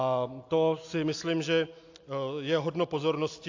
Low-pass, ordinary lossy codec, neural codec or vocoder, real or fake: 7.2 kHz; AAC, 48 kbps; none; real